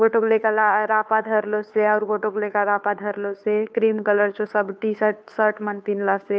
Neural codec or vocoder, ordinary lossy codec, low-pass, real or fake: codec, 16 kHz, 2 kbps, FunCodec, trained on Chinese and English, 25 frames a second; none; none; fake